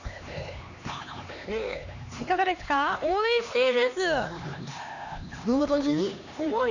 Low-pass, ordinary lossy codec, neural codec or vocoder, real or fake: 7.2 kHz; none; codec, 16 kHz, 2 kbps, X-Codec, HuBERT features, trained on LibriSpeech; fake